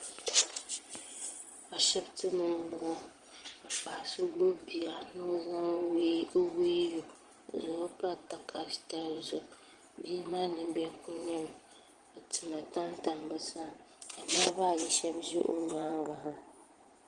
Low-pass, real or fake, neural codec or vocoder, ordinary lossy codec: 9.9 kHz; fake; vocoder, 22.05 kHz, 80 mel bands, Vocos; Opus, 24 kbps